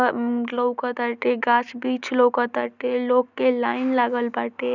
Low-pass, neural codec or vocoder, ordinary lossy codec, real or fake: 7.2 kHz; none; none; real